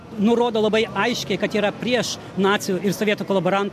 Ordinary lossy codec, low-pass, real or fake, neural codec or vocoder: MP3, 64 kbps; 14.4 kHz; real; none